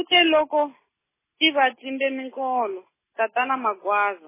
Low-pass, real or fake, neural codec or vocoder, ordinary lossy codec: 3.6 kHz; real; none; MP3, 16 kbps